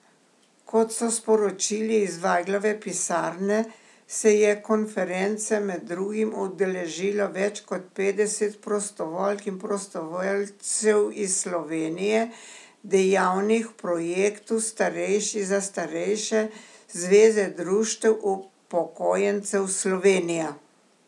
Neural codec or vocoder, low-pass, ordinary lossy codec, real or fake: none; none; none; real